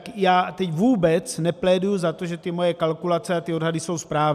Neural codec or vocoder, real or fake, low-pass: none; real; 14.4 kHz